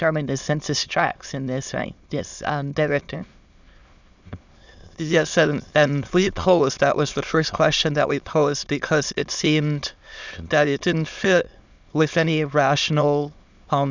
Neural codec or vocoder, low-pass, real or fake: autoencoder, 22.05 kHz, a latent of 192 numbers a frame, VITS, trained on many speakers; 7.2 kHz; fake